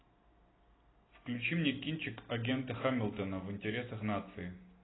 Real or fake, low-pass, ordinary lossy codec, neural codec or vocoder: real; 7.2 kHz; AAC, 16 kbps; none